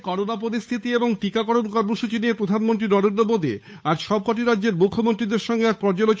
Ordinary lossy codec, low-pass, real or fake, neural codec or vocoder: none; none; fake; codec, 16 kHz, 8 kbps, FunCodec, trained on Chinese and English, 25 frames a second